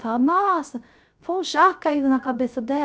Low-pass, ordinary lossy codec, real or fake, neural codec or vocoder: none; none; fake; codec, 16 kHz, 0.3 kbps, FocalCodec